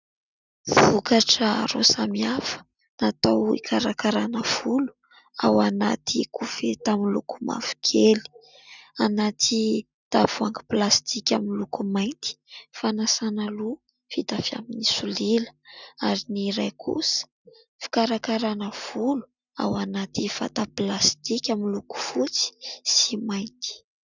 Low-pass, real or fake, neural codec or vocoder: 7.2 kHz; real; none